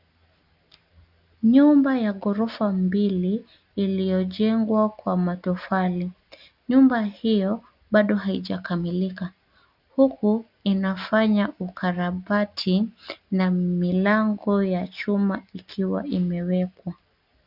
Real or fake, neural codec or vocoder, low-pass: real; none; 5.4 kHz